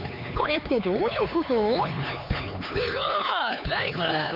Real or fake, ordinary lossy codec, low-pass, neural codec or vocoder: fake; none; 5.4 kHz; codec, 16 kHz, 4 kbps, X-Codec, HuBERT features, trained on LibriSpeech